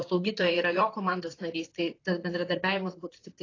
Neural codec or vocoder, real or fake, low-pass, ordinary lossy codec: vocoder, 44.1 kHz, 128 mel bands, Pupu-Vocoder; fake; 7.2 kHz; AAC, 32 kbps